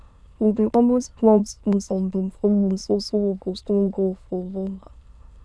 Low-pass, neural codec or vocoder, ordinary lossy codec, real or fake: none; autoencoder, 22.05 kHz, a latent of 192 numbers a frame, VITS, trained on many speakers; none; fake